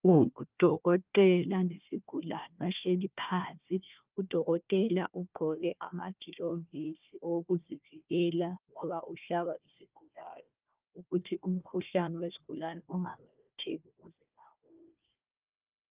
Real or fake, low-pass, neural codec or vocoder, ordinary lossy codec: fake; 3.6 kHz; codec, 16 kHz, 1 kbps, FunCodec, trained on Chinese and English, 50 frames a second; Opus, 24 kbps